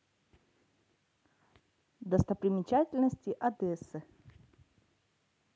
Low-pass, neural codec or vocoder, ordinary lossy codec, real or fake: none; none; none; real